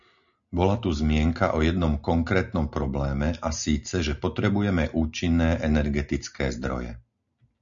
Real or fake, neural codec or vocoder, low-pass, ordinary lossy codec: real; none; 7.2 kHz; MP3, 96 kbps